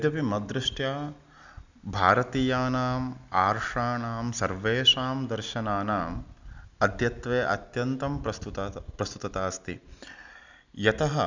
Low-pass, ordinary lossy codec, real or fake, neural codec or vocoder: 7.2 kHz; Opus, 64 kbps; real; none